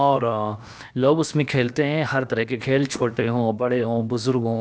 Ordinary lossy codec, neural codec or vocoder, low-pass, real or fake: none; codec, 16 kHz, about 1 kbps, DyCAST, with the encoder's durations; none; fake